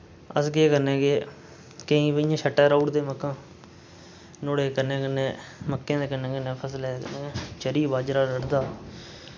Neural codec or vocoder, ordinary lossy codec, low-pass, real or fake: none; none; none; real